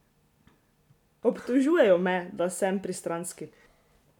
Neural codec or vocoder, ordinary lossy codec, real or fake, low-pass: none; none; real; 19.8 kHz